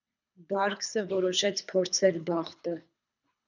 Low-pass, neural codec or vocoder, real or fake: 7.2 kHz; codec, 24 kHz, 3 kbps, HILCodec; fake